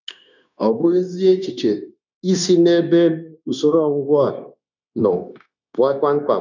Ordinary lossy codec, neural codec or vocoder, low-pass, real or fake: none; codec, 16 kHz, 0.9 kbps, LongCat-Audio-Codec; 7.2 kHz; fake